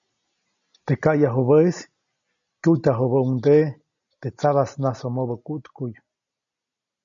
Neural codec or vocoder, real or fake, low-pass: none; real; 7.2 kHz